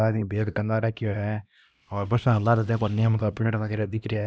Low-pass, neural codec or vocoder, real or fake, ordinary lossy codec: none; codec, 16 kHz, 1 kbps, X-Codec, HuBERT features, trained on LibriSpeech; fake; none